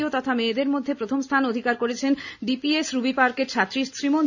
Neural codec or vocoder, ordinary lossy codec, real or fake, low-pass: none; none; real; 7.2 kHz